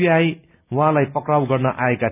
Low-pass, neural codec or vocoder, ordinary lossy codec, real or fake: 3.6 kHz; none; none; real